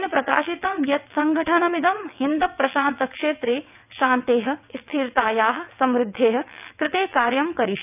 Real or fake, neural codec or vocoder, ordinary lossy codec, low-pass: fake; vocoder, 22.05 kHz, 80 mel bands, WaveNeXt; none; 3.6 kHz